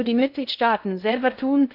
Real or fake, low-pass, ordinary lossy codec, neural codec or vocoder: fake; 5.4 kHz; none; codec, 16 kHz in and 24 kHz out, 0.6 kbps, FocalCodec, streaming, 2048 codes